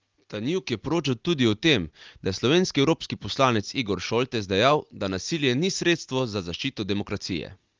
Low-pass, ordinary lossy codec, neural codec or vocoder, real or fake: 7.2 kHz; Opus, 24 kbps; none; real